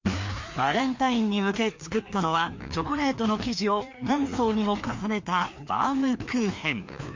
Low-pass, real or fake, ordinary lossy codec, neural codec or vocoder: 7.2 kHz; fake; MP3, 48 kbps; codec, 16 kHz, 2 kbps, FreqCodec, larger model